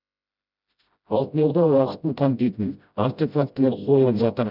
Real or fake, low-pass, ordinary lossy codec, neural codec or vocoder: fake; 5.4 kHz; none; codec, 16 kHz, 0.5 kbps, FreqCodec, smaller model